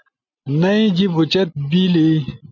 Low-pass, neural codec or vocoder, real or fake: 7.2 kHz; none; real